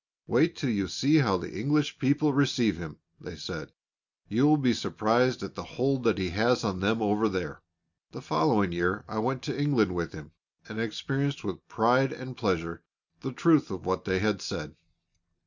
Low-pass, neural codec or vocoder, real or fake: 7.2 kHz; none; real